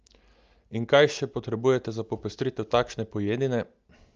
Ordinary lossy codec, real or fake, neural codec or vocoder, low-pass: Opus, 24 kbps; real; none; 7.2 kHz